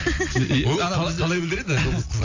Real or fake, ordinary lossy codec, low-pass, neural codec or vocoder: real; AAC, 48 kbps; 7.2 kHz; none